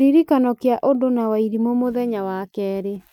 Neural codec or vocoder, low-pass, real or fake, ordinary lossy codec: none; 19.8 kHz; real; none